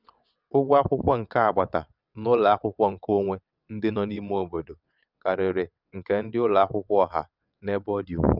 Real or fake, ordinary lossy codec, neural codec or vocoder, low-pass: fake; none; vocoder, 22.05 kHz, 80 mel bands, WaveNeXt; 5.4 kHz